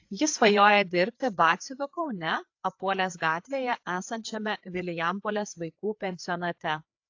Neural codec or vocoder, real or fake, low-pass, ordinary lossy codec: codec, 16 kHz, 4 kbps, FreqCodec, larger model; fake; 7.2 kHz; AAC, 48 kbps